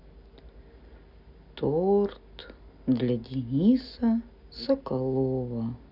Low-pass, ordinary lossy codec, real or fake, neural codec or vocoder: 5.4 kHz; none; real; none